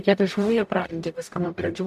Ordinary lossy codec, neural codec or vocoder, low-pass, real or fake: MP3, 96 kbps; codec, 44.1 kHz, 0.9 kbps, DAC; 14.4 kHz; fake